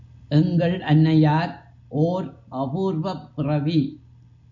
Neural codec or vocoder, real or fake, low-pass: none; real; 7.2 kHz